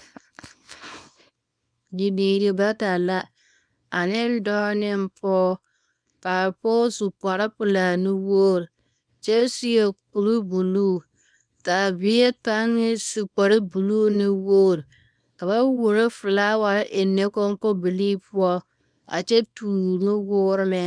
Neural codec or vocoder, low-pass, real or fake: codec, 24 kHz, 0.9 kbps, WavTokenizer, small release; 9.9 kHz; fake